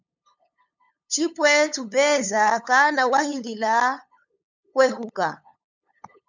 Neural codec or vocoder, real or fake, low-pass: codec, 16 kHz, 8 kbps, FunCodec, trained on LibriTTS, 25 frames a second; fake; 7.2 kHz